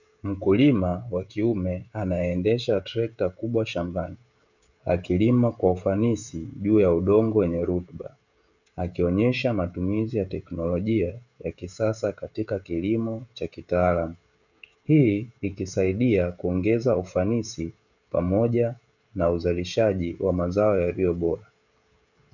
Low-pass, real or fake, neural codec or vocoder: 7.2 kHz; fake; codec, 16 kHz, 16 kbps, FreqCodec, smaller model